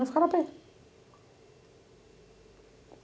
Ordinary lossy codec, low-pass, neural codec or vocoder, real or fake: none; none; none; real